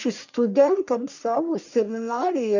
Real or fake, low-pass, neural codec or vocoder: fake; 7.2 kHz; codec, 44.1 kHz, 1.7 kbps, Pupu-Codec